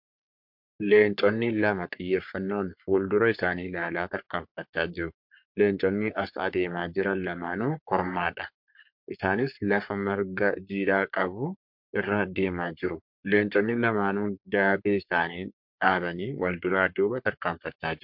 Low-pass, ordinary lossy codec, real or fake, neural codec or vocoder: 5.4 kHz; MP3, 48 kbps; fake; codec, 44.1 kHz, 3.4 kbps, Pupu-Codec